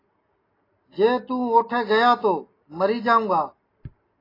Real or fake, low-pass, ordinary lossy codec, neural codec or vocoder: real; 5.4 kHz; AAC, 24 kbps; none